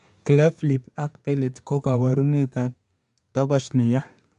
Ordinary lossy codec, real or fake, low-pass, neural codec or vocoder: MP3, 96 kbps; fake; 10.8 kHz; codec, 24 kHz, 1 kbps, SNAC